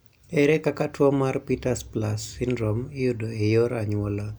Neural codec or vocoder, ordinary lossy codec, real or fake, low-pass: none; none; real; none